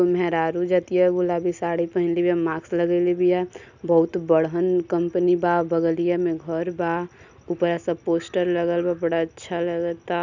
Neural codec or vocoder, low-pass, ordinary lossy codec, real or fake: none; 7.2 kHz; none; real